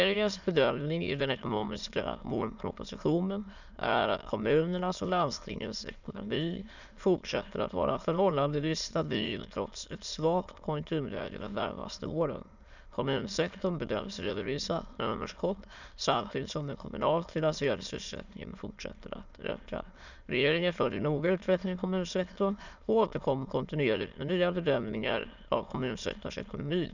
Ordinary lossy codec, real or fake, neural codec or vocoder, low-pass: none; fake; autoencoder, 22.05 kHz, a latent of 192 numbers a frame, VITS, trained on many speakers; 7.2 kHz